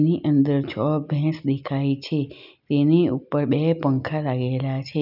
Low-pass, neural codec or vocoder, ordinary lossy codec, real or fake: 5.4 kHz; none; none; real